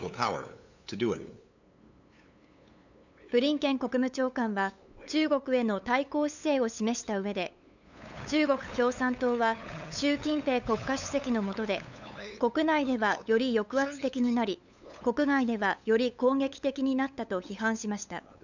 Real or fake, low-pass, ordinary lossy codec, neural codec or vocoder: fake; 7.2 kHz; none; codec, 16 kHz, 8 kbps, FunCodec, trained on LibriTTS, 25 frames a second